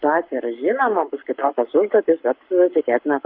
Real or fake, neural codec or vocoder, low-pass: fake; codec, 44.1 kHz, 7.8 kbps, Pupu-Codec; 5.4 kHz